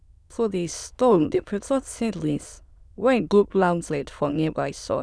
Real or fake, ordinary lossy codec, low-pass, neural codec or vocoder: fake; none; none; autoencoder, 22.05 kHz, a latent of 192 numbers a frame, VITS, trained on many speakers